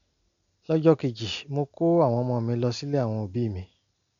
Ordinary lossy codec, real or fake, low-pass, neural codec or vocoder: none; real; 7.2 kHz; none